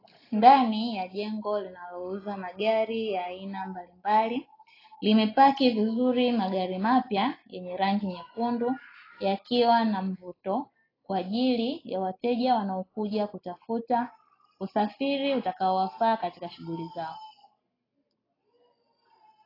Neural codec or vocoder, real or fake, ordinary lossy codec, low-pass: none; real; AAC, 24 kbps; 5.4 kHz